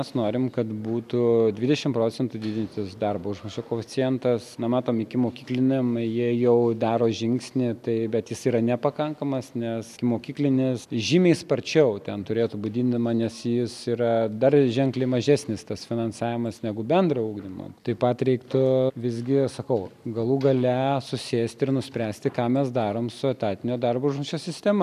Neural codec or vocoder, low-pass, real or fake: none; 14.4 kHz; real